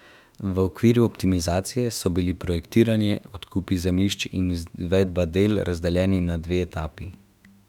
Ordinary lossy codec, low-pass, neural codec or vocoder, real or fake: none; 19.8 kHz; autoencoder, 48 kHz, 32 numbers a frame, DAC-VAE, trained on Japanese speech; fake